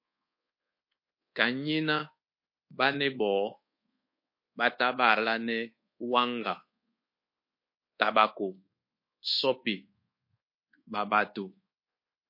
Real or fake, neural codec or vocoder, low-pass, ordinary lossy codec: fake; codec, 24 kHz, 1.2 kbps, DualCodec; 5.4 kHz; MP3, 32 kbps